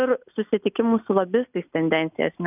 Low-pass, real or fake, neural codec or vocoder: 3.6 kHz; real; none